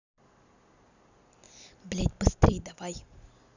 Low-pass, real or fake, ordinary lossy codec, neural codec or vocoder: 7.2 kHz; real; none; none